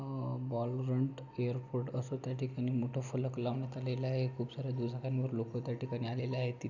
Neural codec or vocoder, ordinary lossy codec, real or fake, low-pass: none; none; real; 7.2 kHz